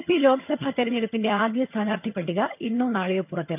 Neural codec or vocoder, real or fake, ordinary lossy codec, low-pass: vocoder, 22.05 kHz, 80 mel bands, HiFi-GAN; fake; none; 3.6 kHz